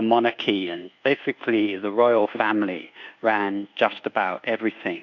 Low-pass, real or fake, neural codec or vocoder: 7.2 kHz; fake; codec, 24 kHz, 1.2 kbps, DualCodec